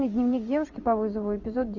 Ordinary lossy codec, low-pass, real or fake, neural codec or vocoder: Opus, 64 kbps; 7.2 kHz; fake; vocoder, 24 kHz, 100 mel bands, Vocos